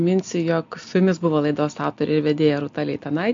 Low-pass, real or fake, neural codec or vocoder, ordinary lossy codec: 7.2 kHz; real; none; MP3, 48 kbps